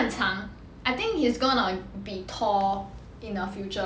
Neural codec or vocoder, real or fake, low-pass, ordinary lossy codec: none; real; none; none